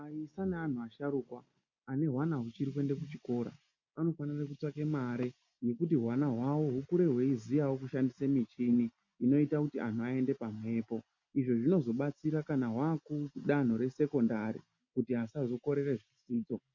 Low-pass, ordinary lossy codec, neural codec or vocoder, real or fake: 7.2 kHz; MP3, 48 kbps; none; real